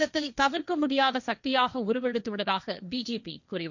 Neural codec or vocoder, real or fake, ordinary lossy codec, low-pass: codec, 16 kHz, 1.1 kbps, Voila-Tokenizer; fake; none; 7.2 kHz